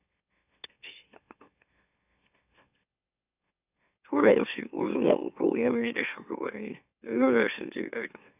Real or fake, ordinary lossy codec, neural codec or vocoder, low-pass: fake; none; autoencoder, 44.1 kHz, a latent of 192 numbers a frame, MeloTTS; 3.6 kHz